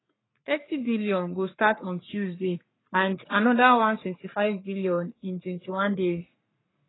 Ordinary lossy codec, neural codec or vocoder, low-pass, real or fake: AAC, 16 kbps; codec, 44.1 kHz, 3.4 kbps, Pupu-Codec; 7.2 kHz; fake